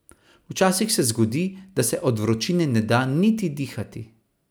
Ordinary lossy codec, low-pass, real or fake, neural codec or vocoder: none; none; real; none